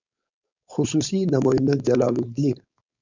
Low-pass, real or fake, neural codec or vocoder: 7.2 kHz; fake; codec, 16 kHz, 4.8 kbps, FACodec